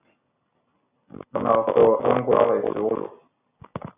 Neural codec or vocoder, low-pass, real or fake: none; 3.6 kHz; real